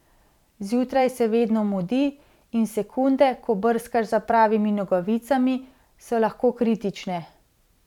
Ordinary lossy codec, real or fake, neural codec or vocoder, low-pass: none; fake; vocoder, 44.1 kHz, 128 mel bands every 512 samples, BigVGAN v2; 19.8 kHz